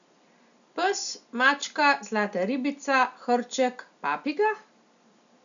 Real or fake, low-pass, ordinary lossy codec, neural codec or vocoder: real; 7.2 kHz; none; none